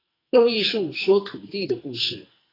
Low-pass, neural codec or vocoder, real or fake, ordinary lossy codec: 5.4 kHz; codec, 44.1 kHz, 2.6 kbps, SNAC; fake; AAC, 24 kbps